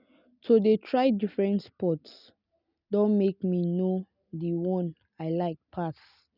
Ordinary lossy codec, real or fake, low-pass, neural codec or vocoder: none; real; 5.4 kHz; none